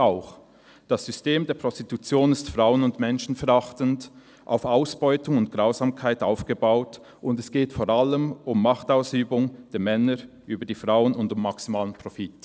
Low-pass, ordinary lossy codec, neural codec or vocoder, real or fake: none; none; none; real